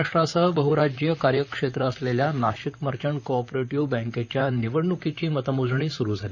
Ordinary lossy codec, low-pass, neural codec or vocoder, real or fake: none; 7.2 kHz; vocoder, 44.1 kHz, 128 mel bands, Pupu-Vocoder; fake